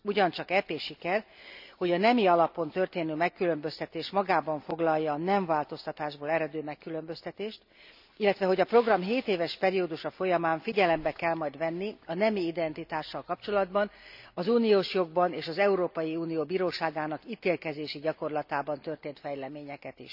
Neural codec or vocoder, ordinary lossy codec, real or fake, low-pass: none; none; real; 5.4 kHz